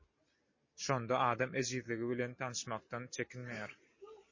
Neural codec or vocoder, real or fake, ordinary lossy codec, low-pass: none; real; MP3, 32 kbps; 7.2 kHz